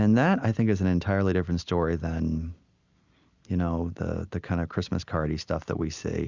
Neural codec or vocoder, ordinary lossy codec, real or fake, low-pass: none; Opus, 64 kbps; real; 7.2 kHz